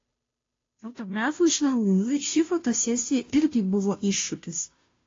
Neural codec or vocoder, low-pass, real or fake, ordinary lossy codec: codec, 16 kHz, 0.5 kbps, FunCodec, trained on Chinese and English, 25 frames a second; 7.2 kHz; fake; AAC, 32 kbps